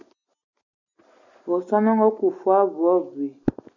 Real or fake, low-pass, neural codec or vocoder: real; 7.2 kHz; none